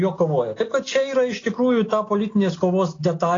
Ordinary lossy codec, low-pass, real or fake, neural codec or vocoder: AAC, 32 kbps; 7.2 kHz; real; none